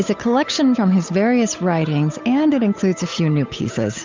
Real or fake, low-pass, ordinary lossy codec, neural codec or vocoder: fake; 7.2 kHz; MP3, 64 kbps; codec, 16 kHz, 8 kbps, FunCodec, trained on Chinese and English, 25 frames a second